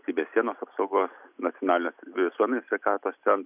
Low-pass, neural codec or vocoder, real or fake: 3.6 kHz; none; real